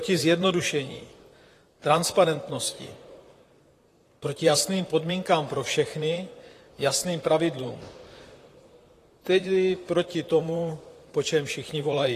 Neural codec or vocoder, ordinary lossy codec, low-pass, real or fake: vocoder, 44.1 kHz, 128 mel bands, Pupu-Vocoder; AAC, 48 kbps; 14.4 kHz; fake